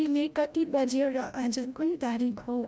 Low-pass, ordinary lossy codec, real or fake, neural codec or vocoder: none; none; fake; codec, 16 kHz, 0.5 kbps, FreqCodec, larger model